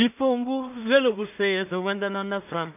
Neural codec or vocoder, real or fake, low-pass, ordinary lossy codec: codec, 16 kHz in and 24 kHz out, 0.4 kbps, LongCat-Audio-Codec, two codebook decoder; fake; 3.6 kHz; none